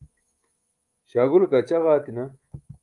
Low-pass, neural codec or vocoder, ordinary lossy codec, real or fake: 10.8 kHz; codec, 24 kHz, 3.1 kbps, DualCodec; Opus, 32 kbps; fake